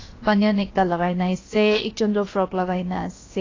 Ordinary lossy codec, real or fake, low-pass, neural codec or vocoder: AAC, 32 kbps; fake; 7.2 kHz; codec, 16 kHz, 0.7 kbps, FocalCodec